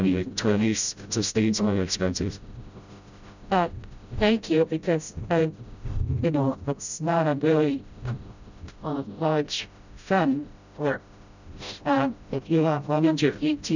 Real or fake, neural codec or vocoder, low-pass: fake; codec, 16 kHz, 0.5 kbps, FreqCodec, smaller model; 7.2 kHz